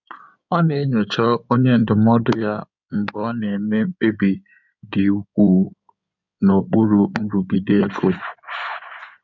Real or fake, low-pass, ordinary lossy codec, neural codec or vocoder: fake; 7.2 kHz; none; codec, 16 kHz in and 24 kHz out, 2.2 kbps, FireRedTTS-2 codec